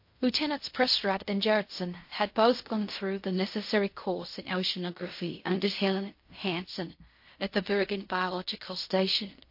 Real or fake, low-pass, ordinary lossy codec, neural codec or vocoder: fake; 5.4 kHz; MP3, 32 kbps; codec, 16 kHz in and 24 kHz out, 0.4 kbps, LongCat-Audio-Codec, fine tuned four codebook decoder